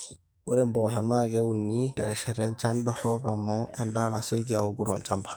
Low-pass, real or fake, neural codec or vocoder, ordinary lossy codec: none; fake; codec, 44.1 kHz, 2.6 kbps, SNAC; none